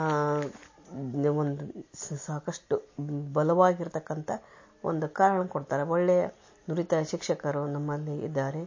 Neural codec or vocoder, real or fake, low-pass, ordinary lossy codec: none; real; 7.2 kHz; MP3, 32 kbps